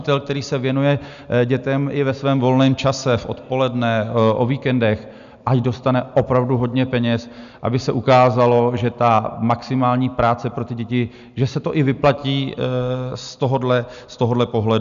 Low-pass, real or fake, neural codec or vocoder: 7.2 kHz; real; none